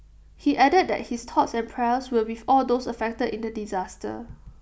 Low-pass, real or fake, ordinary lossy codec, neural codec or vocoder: none; real; none; none